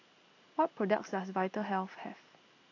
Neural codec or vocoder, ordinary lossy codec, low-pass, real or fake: none; AAC, 48 kbps; 7.2 kHz; real